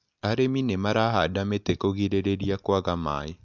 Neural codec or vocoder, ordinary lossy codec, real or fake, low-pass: none; none; real; 7.2 kHz